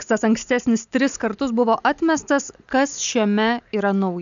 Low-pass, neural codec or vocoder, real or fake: 7.2 kHz; none; real